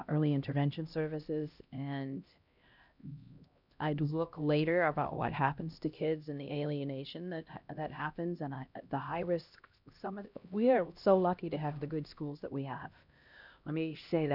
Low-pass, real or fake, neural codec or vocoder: 5.4 kHz; fake; codec, 16 kHz, 1 kbps, X-Codec, HuBERT features, trained on LibriSpeech